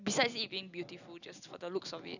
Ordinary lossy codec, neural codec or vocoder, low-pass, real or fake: none; none; 7.2 kHz; real